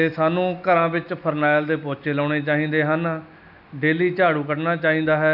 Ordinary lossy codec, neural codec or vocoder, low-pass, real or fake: none; none; 5.4 kHz; real